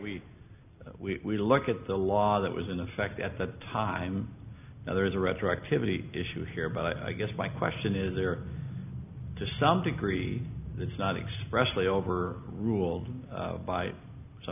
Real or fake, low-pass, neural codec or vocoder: real; 3.6 kHz; none